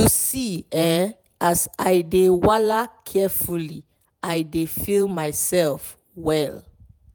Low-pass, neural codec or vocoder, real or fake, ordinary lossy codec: none; vocoder, 48 kHz, 128 mel bands, Vocos; fake; none